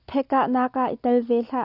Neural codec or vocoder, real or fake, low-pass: none; real; 5.4 kHz